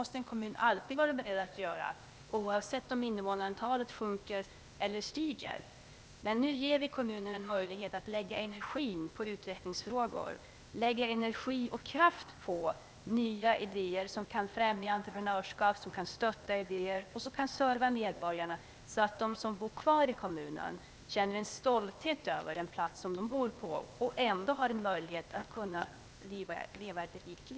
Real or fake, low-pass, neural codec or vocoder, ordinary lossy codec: fake; none; codec, 16 kHz, 0.8 kbps, ZipCodec; none